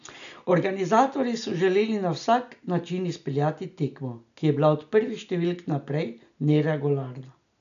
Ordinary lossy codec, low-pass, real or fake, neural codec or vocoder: none; 7.2 kHz; real; none